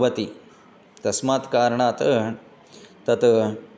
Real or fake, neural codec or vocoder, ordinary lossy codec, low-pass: real; none; none; none